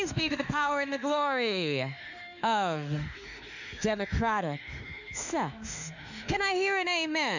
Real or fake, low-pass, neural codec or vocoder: fake; 7.2 kHz; autoencoder, 48 kHz, 32 numbers a frame, DAC-VAE, trained on Japanese speech